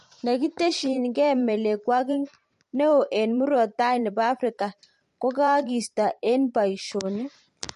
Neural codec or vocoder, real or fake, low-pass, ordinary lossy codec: vocoder, 44.1 kHz, 128 mel bands every 512 samples, BigVGAN v2; fake; 14.4 kHz; MP3, 48 kbps